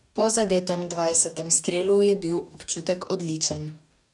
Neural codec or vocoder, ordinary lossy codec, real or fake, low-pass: codec, 44.1 kHz, 2.6 kbps, DAC; none; fake; 10.8 kHz